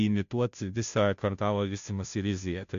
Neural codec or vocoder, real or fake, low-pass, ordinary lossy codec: codec, 16 kHz, 0.5 kbps, FunCodec, trained on Chinese and English, 25 frames a second; fake; 7.2 kHz; MP3, 64 kbps